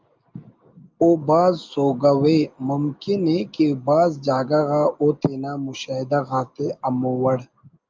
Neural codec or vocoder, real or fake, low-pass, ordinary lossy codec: none; real; 7.2 kHz; Opus, 24 kbps